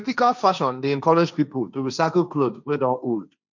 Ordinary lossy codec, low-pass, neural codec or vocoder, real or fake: none; 7.2 kHz; codec, 16 kHz, 1.1 kbps, Voila-Tokenizer; fake